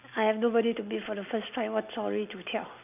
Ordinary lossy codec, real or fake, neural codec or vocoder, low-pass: none; real; none; 3.6 kHz